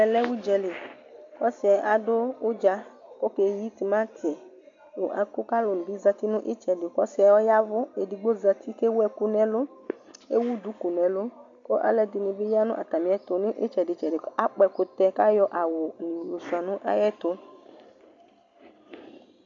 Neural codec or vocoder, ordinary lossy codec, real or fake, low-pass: none; MP3, 64 kbps; real; 7.2 kHz